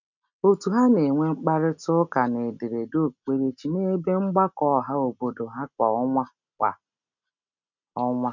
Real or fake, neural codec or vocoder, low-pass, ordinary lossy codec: real; none; 7.2 kHz; none